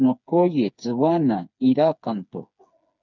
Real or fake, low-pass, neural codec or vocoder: fake; 7.2 kHz; codec, 16 kHz, 4 kbps, FreqCodec, smaller model